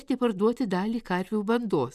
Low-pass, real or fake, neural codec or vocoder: 14.4 kHz; real; none